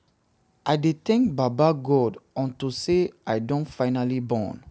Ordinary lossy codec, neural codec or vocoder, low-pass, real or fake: none; none; none; real